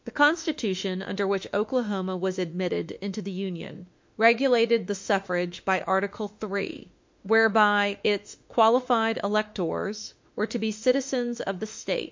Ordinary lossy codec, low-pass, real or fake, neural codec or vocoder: MP3, 48 kbps; 7.2 kHz; fake; autoencoder, 48 kHz, 32 numbers a frame, DAC-VAE, trained on Japanese speech